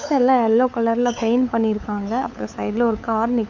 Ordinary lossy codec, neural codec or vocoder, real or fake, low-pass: none; codec, 16 kHz, 8 kbps, FunCodec, trained on LibriTTS, 25 frames a second; fake; 7.2 kHz